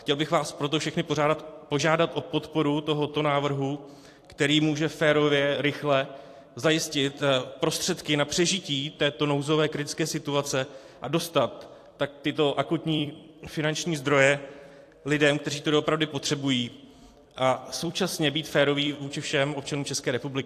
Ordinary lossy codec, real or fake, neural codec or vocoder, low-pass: AAC, 64 kbps; fake; vocoder, 44.1 kHz, 128 mel bands every 256 samples, BigVGAN v2; 14.4 kHz